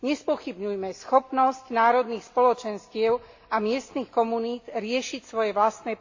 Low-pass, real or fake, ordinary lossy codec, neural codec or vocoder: 7.2 kHz; real; AAC, 48 kbps; none